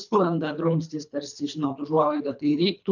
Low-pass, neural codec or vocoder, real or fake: 7.2 kHz; codec, 24 kHz, 3 kbps, HILCodec; fake